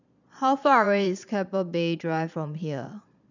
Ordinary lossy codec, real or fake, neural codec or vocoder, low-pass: none; fake; vocoder, 22.05 kHz, 80 mel bands, Vocos; 7.2 kHz